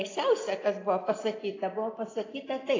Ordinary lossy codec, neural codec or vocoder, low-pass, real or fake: AAC, 32 kbps; none; 7.2 kHz; real